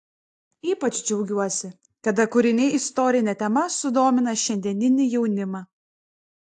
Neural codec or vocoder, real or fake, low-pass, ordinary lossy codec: none; real; 10.8 kHz; AAC, 64 kbps